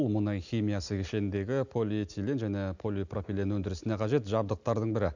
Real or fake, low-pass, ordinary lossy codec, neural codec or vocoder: real; 7.2 kHz; none; none